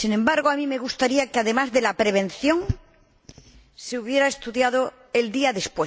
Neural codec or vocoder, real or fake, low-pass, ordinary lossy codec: none; real; none; none